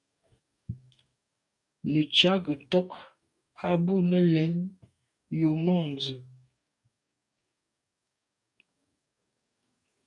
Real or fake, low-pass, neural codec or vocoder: fake; 10.8 kHz; codec, 44.1 kHz, 2.6 kbps, DAC